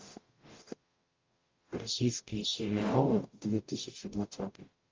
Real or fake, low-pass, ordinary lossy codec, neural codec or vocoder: fake; 7.2 kHz; Opus, 24 kbps; codec, 44.1 kHz, 0.9 kbps, DAC